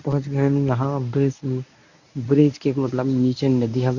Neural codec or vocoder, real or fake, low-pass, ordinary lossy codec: codec, 24 kHz, 0.9 kbps, WavTokenizer, medium speech release version 1; fake; 7.2 kHz; none